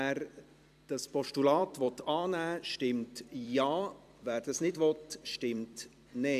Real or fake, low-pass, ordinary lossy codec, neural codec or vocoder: fake; 14.4 kHz; AAC, 96 kbps; vocoder, 44.1 kHz, 128 mel bands every 256 samples, BigVGAN v2